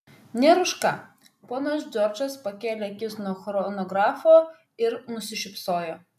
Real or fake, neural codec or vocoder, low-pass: real; none; 14.4 kHz